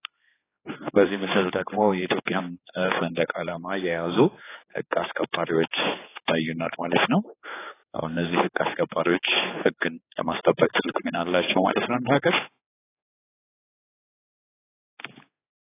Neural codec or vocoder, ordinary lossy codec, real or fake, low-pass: codec, 16 kHz, 4 kbps, X-Codec, HuBERT features, trained on general audio; AAC, 16 kbps; fake; 3.6 kHz